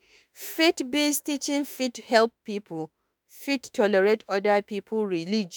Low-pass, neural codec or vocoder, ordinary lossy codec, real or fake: none; autoencoder, 48 kHz, 32 numbers a frame, DAC-VAE, trained on Japanese speech; none; fake